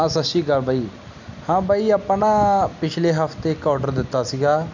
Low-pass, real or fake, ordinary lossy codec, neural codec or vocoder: 7.2 kHz; real; MP3, 64 kbps; none